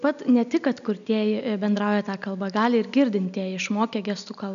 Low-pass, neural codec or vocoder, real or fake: 7.2 kHz; none; real